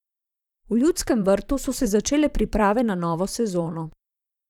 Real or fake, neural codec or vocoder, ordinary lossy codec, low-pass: fake; vocoder, 44.1 kHz, 128 mel bands, Pupu-Vocoder; none; 19.8 kHz